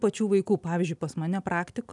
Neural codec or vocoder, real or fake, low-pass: none; real; 10.8 kHz